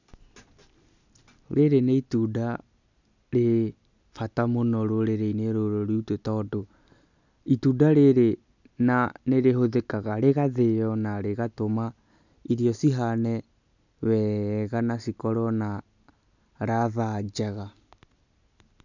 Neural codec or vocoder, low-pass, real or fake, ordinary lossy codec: none; 7.2 kHz; real; none